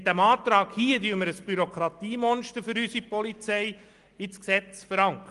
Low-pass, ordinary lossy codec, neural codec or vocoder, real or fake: 10.8 kHz; Opus, 24 kbps; none; real